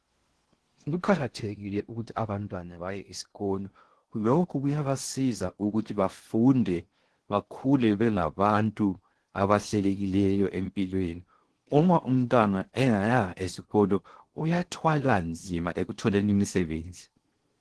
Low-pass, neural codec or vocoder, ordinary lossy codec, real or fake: 10.8 kHz; codec, 16 kHz in and 24 kHz out, 0.6 kbps, FocalCodec, streaming, 2048 codes; Opus, 16 kbps; fake